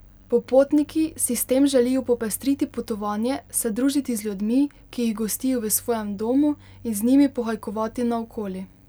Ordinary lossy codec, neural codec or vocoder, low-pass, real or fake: none; none; none; real